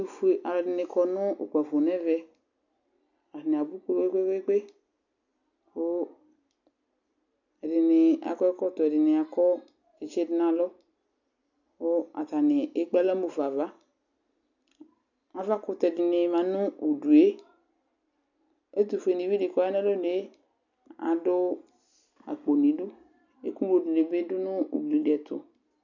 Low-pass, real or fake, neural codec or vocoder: 7.2 kHz; real; none